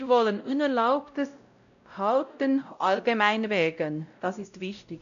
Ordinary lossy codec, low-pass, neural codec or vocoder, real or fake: none; 7.2 kHz; codec, 16 kHz, 0.5 kbps, X-Codec, WavLM features, trained on Multilingual LibriSpeech; fake